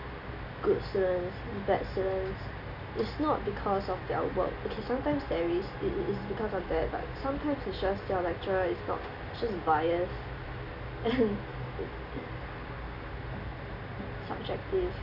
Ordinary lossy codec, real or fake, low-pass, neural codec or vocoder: none; real; 5.4 kHz; none